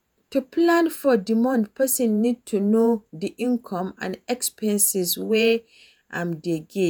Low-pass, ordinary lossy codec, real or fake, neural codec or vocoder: none; none; fake; vocoder, 48 kHz, 128 mel bands, Vocos